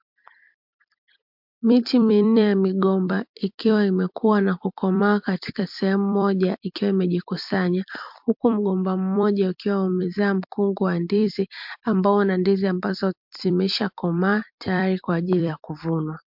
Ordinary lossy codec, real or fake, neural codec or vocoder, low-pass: MP3, 48 kbps; fake; vocoder, 44.1 kHz, 128 mel bands every 256 samples, BigVGAN v2; 5.4 kHz